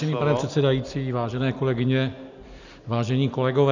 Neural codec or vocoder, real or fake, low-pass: none; real; 7.2 kHz